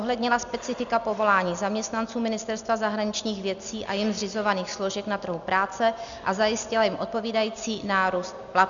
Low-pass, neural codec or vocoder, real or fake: 7.2 kHz; none; real